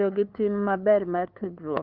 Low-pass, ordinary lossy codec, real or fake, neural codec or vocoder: 5.4 kHz; Opus, 32 kbps; fake; codec, 16 kHz, 2 kbps, FunCodec, trained on LibriTTS, 25 frames a second